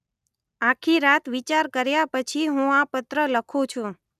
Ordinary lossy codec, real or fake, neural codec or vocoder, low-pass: none; real; none; 14.4 kHz